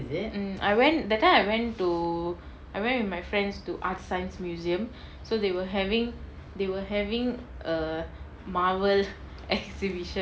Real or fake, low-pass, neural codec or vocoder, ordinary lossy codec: real; none; none; none